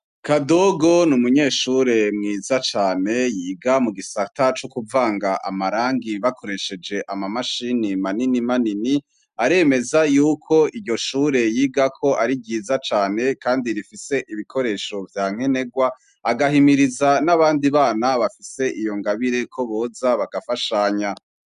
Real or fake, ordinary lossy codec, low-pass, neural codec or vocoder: real; MP3, 96 kbps; 10.8 kHz; none